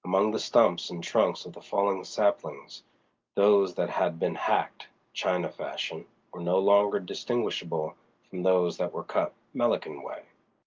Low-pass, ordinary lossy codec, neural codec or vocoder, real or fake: 7.2 kHz; Opus, 32 kbps; none; real